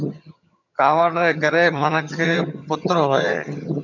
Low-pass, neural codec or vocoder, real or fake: 7.2 kHz; vocoder, 22.05 kHz, 80 mel bands, HiFi-GAN; fake